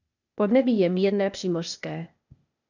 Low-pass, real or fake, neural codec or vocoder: 7.2 kHz; fake; codec, 16 kHz, 0.8 kbps, ZipCodec